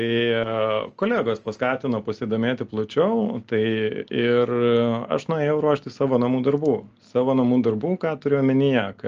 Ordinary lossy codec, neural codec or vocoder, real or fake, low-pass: Opus, 24 kbps; none; real; 7.2 kHz